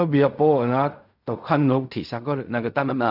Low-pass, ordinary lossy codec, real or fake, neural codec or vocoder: 5.4 kHz; none; fake; codec, 16 kHz in and 24 kHz out, 0.4 kbps, LongCat-Audio-Codec, fine tuned four codebook decoder